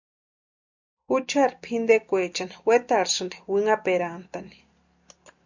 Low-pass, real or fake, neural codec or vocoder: 7.2 kHz; real; none